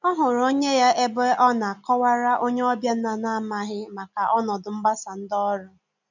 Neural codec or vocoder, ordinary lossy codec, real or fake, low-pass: none; none; real; 7.2 kHz